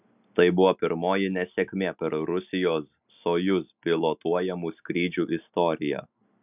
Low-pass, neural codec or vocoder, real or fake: 3.6 kHz; none; real